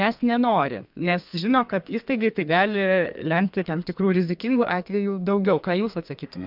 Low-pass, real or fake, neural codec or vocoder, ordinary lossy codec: 5.4 kHz; fake; codec, 32 kHz, 1.9 kbps, SNAC; MP3, 48 kbps